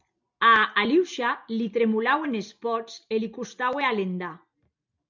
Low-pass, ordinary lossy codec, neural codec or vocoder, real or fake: 7.2 kHz; MP3, 64 kbps; none; real